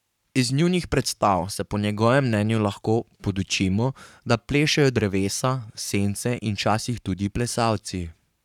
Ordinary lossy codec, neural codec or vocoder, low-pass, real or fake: none; codec, 44.1 kHz, 7.8 kbps, Pupu-Codec; 19.8 kHz; fake